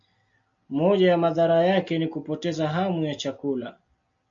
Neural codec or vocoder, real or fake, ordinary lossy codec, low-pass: none; real; MP3, 64 kbps; 7.2 kHz